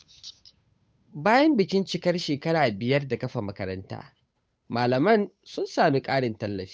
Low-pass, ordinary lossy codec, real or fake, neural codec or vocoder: none; none; fake; codec, 16 kHz, 8 kbps, FunCodec, trained on Chinese and English, 25 frames a second